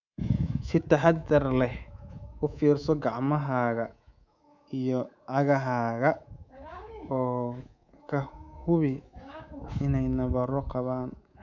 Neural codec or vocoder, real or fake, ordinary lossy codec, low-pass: codec, 24 kHz, 3.1 kbps, DualCodec; fake; none; 7.2 kHz